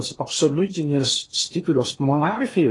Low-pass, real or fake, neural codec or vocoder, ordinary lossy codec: 10.8 kHz; fake; codec, 16 kHz in and 24 kHz out, 0.8 kbps, FocalCodec, streaming, 65536 codes; AAC, 32 kbps